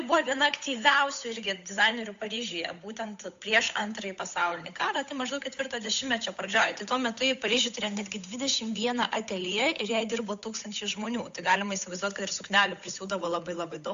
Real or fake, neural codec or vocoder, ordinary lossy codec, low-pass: fake; codec, 16 kHz, 8 kbps, FunCodec, trained on Chinese and English, 25 frames a second; AAC, 64 kbps; 7.2 kHz